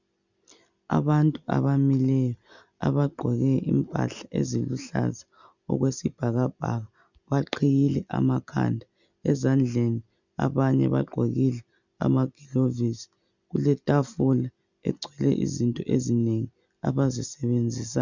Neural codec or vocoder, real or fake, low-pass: none; real; 7.2 kHz